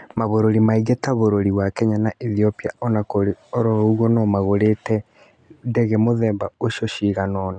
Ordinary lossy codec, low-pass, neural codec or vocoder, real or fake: none; 9.9 kHz; none; real